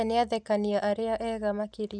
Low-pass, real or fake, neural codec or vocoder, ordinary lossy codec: 9.9 kHz; real; none; none